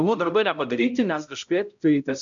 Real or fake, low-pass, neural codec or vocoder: fake; 7.2 kHz; codec, 16 kHz, 0.5 kbps, X-Codec, HuBERT features, trained on balanced general audio